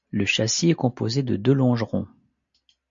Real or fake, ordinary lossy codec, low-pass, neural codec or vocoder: real; MP3, 48 kbps; 7.2 kHz; none